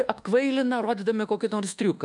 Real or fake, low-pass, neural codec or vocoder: fake; 10.8 kHz; codec, 24 kHz, 1.2 kbps, DualCodec